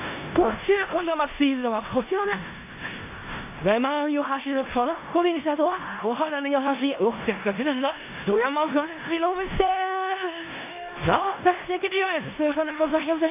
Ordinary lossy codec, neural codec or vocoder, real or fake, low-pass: AAC, 24 kbps; codec, 16 kHz in and 24 kHz out, 0.4 kbps, LongCat-Audio-Codec, four codebook decoder; fake; 3.6 kHz